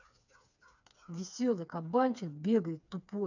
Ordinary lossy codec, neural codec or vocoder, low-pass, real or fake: none; codec, 16 kHz, 4 kbps, FreqCodec, smaller model; 7.2 kHz; fake